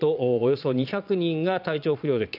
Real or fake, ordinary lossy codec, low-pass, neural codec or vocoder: fake; none; 5.4 kHz; vocoder, 22.05 kHz, 80 mel bands, Vocos